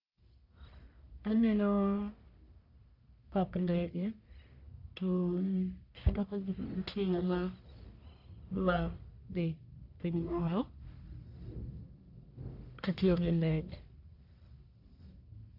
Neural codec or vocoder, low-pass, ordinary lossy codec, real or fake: codec, 44.1 kHz, 1.7 kbps, Pupu-Codec; 5.4 kHz; none; fake